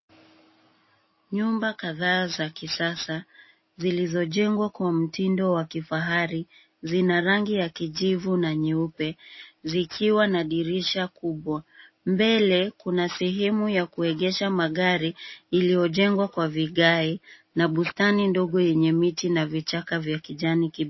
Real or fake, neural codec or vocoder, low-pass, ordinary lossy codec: real; none; 7.2 kHz; MP3, 24 kbps